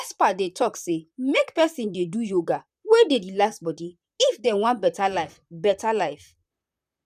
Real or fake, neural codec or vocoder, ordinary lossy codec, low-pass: fake; vocoder, 44.1 kHz, 128 mel bands, Pupu-Vocoder; none; 14.4 kHz